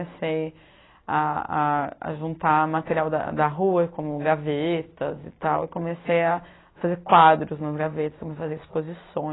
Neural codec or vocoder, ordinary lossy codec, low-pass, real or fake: none; AAC, 16 kbps; 7.2 kHz; real